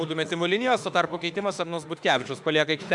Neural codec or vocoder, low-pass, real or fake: autoencoder, 48 kHz, 32 numbers a frame, DAC-VAE, trained on Japanese speech; 10.8 kHz; fake